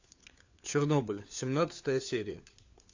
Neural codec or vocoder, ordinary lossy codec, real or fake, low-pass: codec, 16 kHz, 4 kbps, FunCodec, trained on LibriTTS, 50 frames a second; AAC, 48 kbps; fake; 7.2 kHz